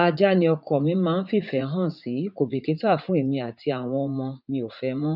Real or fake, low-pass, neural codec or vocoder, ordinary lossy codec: fake; 5.4 kHz; vocoder, 44.1 kHz, 80 mel bands, Vocos; none